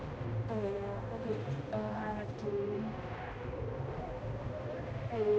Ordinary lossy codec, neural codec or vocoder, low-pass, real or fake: none; codec, 16 kHz, 1 kbps, X-Codec, HuBERT features, trained on balanced general audio; none; fake